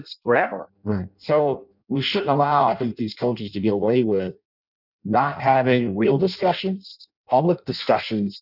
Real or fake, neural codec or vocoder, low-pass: fake; codec, 16 kHz in and 24 kHz out, 0.6 kbps, FireRedTTS-2 codec; 5.4 kHz